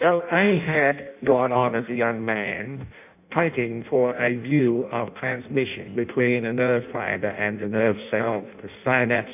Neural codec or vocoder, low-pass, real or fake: codec, 16 kHz in and 24 kHz out, 0.6 kbps, FireRedTTS-2 codec; 3.6 kHz; fake